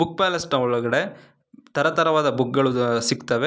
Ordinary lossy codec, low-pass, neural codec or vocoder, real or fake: none; none; none; real